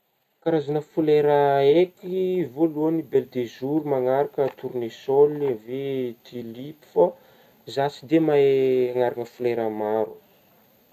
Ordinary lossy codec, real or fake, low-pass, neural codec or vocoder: none; real; 14.4 kHz; none